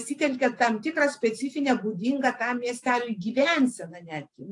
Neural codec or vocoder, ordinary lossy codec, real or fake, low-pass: none; AAC, 64 kbps; real; 10.8 kHz